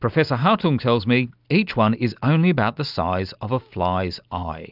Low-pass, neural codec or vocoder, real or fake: 5.4 kHz; none; real